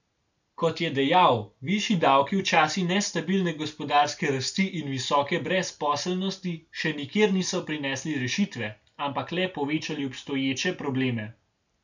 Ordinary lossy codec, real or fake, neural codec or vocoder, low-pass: none; real; none; 7.2 kHz